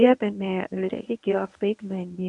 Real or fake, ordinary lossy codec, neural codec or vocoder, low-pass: fake; MP3, 96 kbps; codec, 24 kHz, 0.9 kbps, WavTokenizer, medium speech release version 1; 10.8 kHz